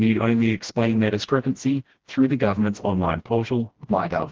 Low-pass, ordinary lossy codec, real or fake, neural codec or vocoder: 7.2 kHz; Opus, 16 kbps; fake; codec, 16 kHz, 1 kbps, FreqCodec, smaller model